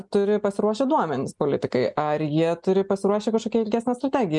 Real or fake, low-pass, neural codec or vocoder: real; 10.8 kHz; none